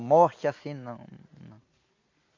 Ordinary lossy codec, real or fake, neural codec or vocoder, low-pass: MP3, 64 kbps; real; none; 7.2 kHz